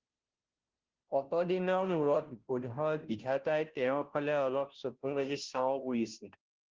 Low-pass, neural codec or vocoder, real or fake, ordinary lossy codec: 7.2 kHz; codec, 16 kHz, 0.5 kbps, FunCodec, trained on Chinese and English, 25 frames a second; fake; Opus, 16 kbps